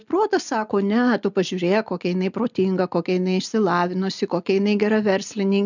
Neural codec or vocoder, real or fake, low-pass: none; real; 7.2 kHz